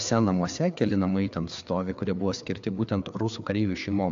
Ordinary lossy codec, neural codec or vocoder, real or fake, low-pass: AAC, 64 kbps; codec, 16 kHz, 4 kbps, FreqCodec, larger model; fake; 7.2 kHz